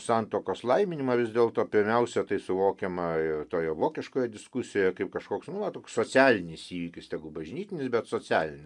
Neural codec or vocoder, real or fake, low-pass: none; real; 10.8 kHz